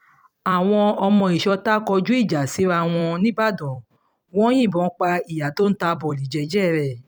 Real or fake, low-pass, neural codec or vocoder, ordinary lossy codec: fake; 19.8 kHz; vocoder, 44.1 kHz, 128 mel bands every 256 samples, BigVGAN v2; none